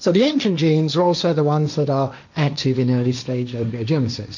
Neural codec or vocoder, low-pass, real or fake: codec, 16 kHz, 1.1 kbps, Voila-Tokenizer; 7.2 kHz; fake